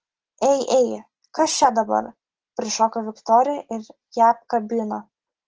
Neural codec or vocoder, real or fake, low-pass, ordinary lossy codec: none; real; 7.2 kHz; Opus, 16 kbps